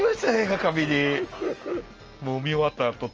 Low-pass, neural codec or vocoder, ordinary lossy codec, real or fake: 7.2 kHz; none; Opus, 24 kbps; real